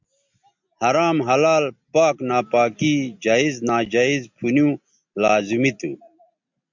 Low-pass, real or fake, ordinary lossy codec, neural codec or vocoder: 7.2 kHz; real; MP3, 64 kbps; none